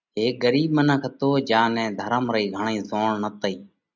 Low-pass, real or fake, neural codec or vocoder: 7.2 kHz; real; none